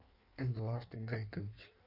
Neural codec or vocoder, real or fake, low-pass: codec, 16 kHz in and 24 kHz out, 1.1 kbps, FireRedTTS-2 codec; fake; 5.4 kHz